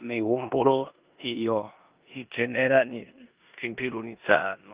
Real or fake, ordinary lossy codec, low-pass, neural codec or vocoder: fake; Opus, 24 kbps; 3.6 kHz; codec, 16 kHz in and 24 kHz out, 0.9 kbps, LongCat-Audio-Codec, four codebook decoder